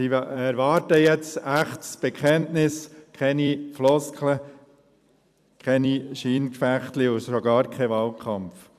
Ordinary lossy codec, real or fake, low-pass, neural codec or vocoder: AAC, 96 kbps; fake; 14.4 kHz; vocoder, 44.1 kHz, 128 mel bands every 256 samples, BigVGAN v2